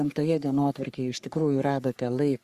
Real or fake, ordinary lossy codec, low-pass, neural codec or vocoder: fake; Opus, 64 kbps; 14.4 kHz; codec, 44.1 kHz, 3.4 kbps, Pupu-Codec